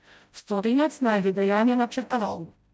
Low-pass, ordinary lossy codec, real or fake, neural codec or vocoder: none; none; fake; codec, 16 kHz, 0.5 kbps, FreqCodec, smaller model